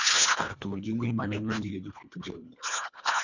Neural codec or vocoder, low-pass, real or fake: codec, 24 kHz, 1.5 kbps, HILCodec; 7.2 kHz; fake